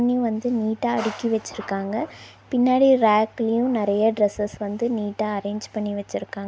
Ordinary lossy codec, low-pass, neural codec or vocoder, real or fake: none; none; none; real